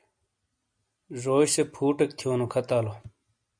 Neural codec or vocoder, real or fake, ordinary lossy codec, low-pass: none; real; MP3, 96 kbps; 9.9 kHz